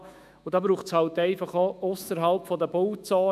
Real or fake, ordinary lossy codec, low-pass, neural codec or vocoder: fake; none; 14.4 kHz; autoencoder, 48 kHz, 128 numbers a frame, DAC-VAE, trained on Japanese speech